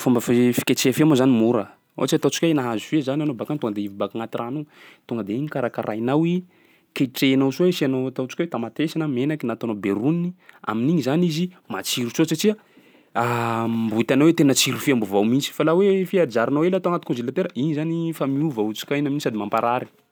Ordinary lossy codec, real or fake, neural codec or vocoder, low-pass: none; real; none; none